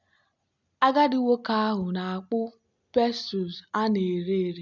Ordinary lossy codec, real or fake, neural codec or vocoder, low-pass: none; real; none; 7.2 kHz